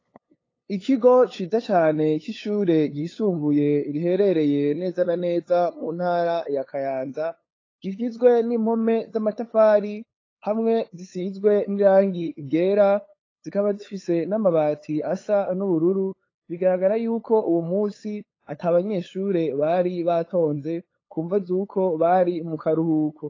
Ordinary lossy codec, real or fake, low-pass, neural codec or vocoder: AAC, 32 kbps; fake; 7.2 kHz; codec, 16 kHz, 8 kbps, FunCodec, trained on LibriTTS, 25 frames a second